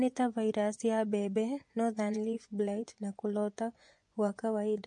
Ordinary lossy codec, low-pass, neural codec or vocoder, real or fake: MP3, 48 kbps; 10.8 kHz; vocoder, 24 kHz, 100 mel bands, Vocos; fake